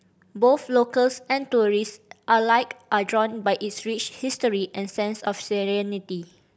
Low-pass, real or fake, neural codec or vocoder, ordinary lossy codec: none; real; none; none